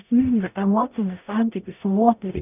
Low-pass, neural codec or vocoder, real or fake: 3.6 kHz; codec, 44.1 kHz, 0.9 kbps, DAC; fake